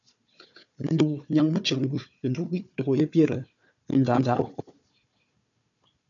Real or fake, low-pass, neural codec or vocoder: fake; 7.2 kHz; codec, 16 kHz, 4 kbps, FunCodec, trained on Chinese and English, 50 frames a second